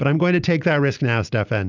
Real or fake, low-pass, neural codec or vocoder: real; 7.2 kHz; none